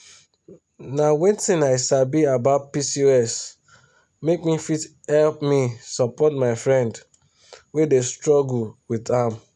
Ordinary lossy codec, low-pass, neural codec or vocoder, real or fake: none; none; none; real